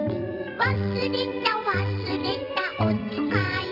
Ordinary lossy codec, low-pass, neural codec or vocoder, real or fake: MP3, 48 kbps; 5.4 kHz; vocoder, 22.05 kHz, 80 mel bands, WaveNeXt; fake